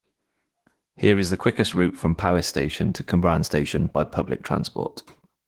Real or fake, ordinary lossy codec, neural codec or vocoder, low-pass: fake; Opus, 16 kbps; autoencoder, 48 kHz, 32 numbers a frame, DAC-VAE, trained on Japanese speech; 19.8 kHz